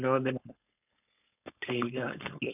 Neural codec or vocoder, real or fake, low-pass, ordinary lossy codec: codec, 16 kHz, 4.8 kbps, FACodec; fake; 3.6 kHz; none